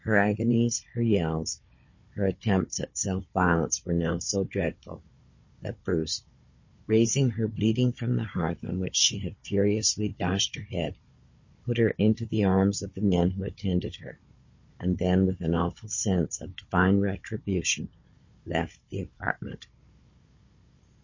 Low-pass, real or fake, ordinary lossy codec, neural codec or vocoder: 7.2 kHz; fake; MP3, 32 kbps; codec, 16 kHz, 16 kbps, FunCodec, trained on Chinese and English, 50 frames a second